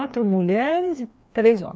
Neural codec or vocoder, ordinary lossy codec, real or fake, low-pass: codec, 16 kHz, 2 kbps, FreqCodec, larger model; none; fake; none